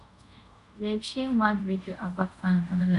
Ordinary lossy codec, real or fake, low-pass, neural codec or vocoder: none; fake; 10.8 kHz; codec, 24 kHz, 0.5 kbps, DualCodec